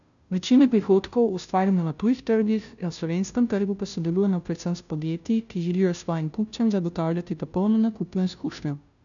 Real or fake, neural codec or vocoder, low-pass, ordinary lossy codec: fake; codec, 16 kHz, 0.5 kbps, FunCodec, trained on Chinese and English, 25 frames a second; 7.2 kHz; none